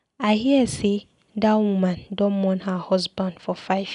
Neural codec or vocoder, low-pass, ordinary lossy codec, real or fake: none; 10.8 kHz; none; real